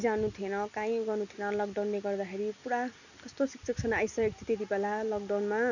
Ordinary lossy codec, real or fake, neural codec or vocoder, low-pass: none; real; none; 7.2 kHz